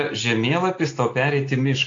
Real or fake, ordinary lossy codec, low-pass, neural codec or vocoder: real; AAC, 48 kbps; 7.2 kHz; none